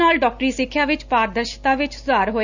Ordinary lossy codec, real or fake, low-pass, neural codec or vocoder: none; real; 7.2 kHz; none